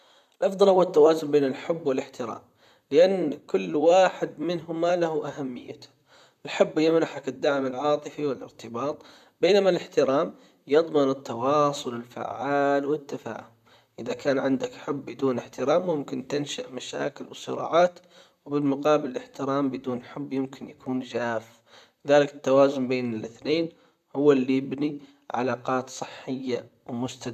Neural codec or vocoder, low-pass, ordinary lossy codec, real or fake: vocoder, 44.1 kHz, 128 mel bands, Pupu-Vocoder; 14.4 kHz; none; fake